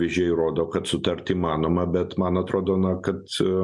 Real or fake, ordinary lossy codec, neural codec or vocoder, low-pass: real; MP3, 64 kbps; none; 10.8 kHz